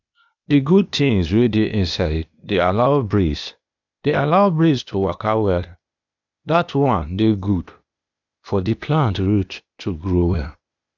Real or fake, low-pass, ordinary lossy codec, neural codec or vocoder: fake; 7.2 kHz; none; codec, 16 kHz, 0.8 kbps, ZipCodec